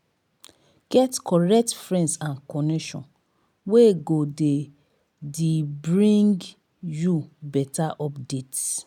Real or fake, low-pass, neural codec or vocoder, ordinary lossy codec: real; 19.8 kHz; none; none